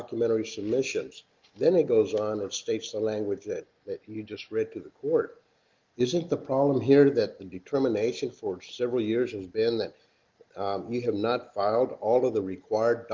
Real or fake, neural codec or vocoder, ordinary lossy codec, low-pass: real; none; Opus, 32 kbps; 7.2 kHz